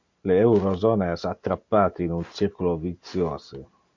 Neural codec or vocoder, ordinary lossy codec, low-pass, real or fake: none; MP3, 48 kbps; 7.2 kHz; real